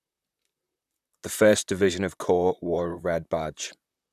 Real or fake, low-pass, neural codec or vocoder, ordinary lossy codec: fake; 14.4 kHz; vocoder, 44.1 kHz, 128 mel bands, Pupu-Vocoder; none